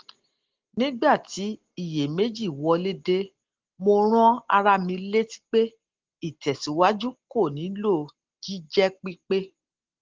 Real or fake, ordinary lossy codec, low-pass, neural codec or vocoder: real; Opus, 16 kbps; 7.2 kHz; none